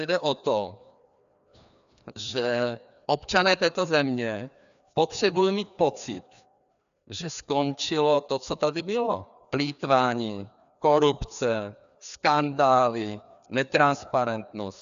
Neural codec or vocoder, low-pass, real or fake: codec, 16 kHz, 2 kbps, FreqCodec, larger model; 7.2 kHz; fake